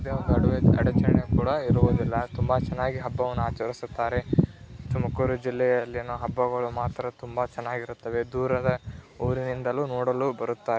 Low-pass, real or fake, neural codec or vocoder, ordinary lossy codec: none; real; none; none